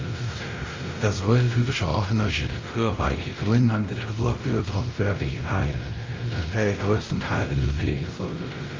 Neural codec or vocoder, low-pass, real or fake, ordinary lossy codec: codec, 16 kHz, 0.5 kbps, X-Codec, WavLM features, trained on Multilingual LibriSpeech; 7.2 kHz; fake; Opus, 32 kbps